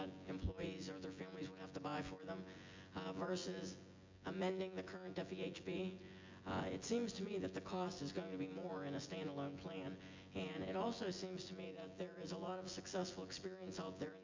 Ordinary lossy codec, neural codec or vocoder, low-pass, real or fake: MP3, 64 kbps; vocoder, 24 kHz, 100 mel bands, Vocos; 7.2 kHz; fake